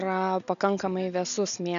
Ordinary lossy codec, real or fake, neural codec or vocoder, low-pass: MP3, 96 kbps; real; none; 7.2 kHz